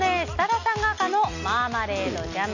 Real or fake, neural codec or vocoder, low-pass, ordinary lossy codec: real; none; 7.2 kHz; none